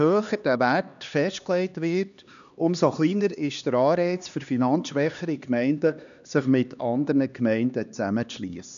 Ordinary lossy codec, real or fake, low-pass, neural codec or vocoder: none; fake; 7.2 kHz; codec, 16 kHz, 2 kbps, X-Codec, HuBERT features, trained on LibriSpeech